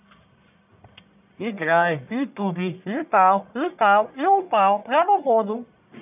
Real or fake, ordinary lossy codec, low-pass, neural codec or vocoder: fake; none; 3.6 kHz; codec, 44.1 kHz, 1.7 kbps, Pupu-Codec